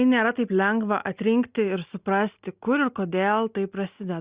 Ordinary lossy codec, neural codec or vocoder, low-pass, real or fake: Opus, 64 kbps; none; 3.6 kHz; real